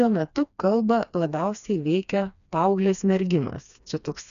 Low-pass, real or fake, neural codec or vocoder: 7.2 kHz; fake; codec, 16 kHz, 2 kbps, FreqCodec, smaller model